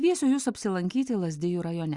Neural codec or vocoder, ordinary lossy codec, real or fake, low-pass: none; Opus, 64 kbps; real; 10.8 kHz